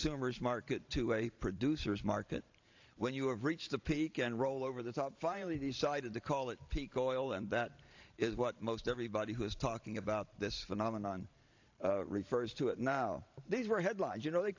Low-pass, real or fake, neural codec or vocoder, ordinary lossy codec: 7.2 kHz; fake; vocoder, 44.1 kHz, 128 mel bands every 512 samples, BigVGAN v2; AAC, 48 kbps